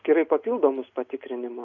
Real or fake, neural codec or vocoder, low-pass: real; none; 7.2 kHz